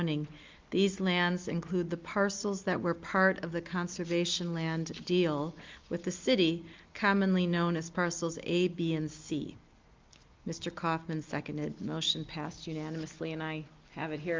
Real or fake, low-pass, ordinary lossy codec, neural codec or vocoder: real; 7.2 kHz; Opus, 24 kbps; none